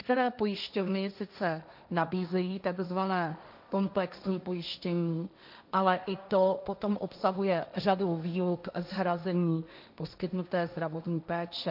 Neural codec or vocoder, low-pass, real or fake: codec, 16 kHz, 1.1 kbps, Voila-Tokenizer; 5.4 kHz; fake